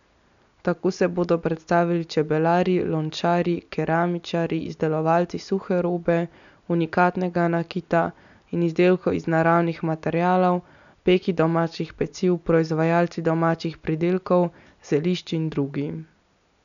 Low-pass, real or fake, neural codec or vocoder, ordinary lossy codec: 7.2 kHz; real; none; none